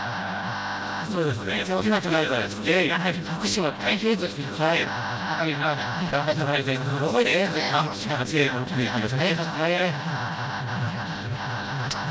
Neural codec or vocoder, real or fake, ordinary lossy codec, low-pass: codec, 16 kHz, 0.5 kbps, FreqCodec, smaller model; fake; none; none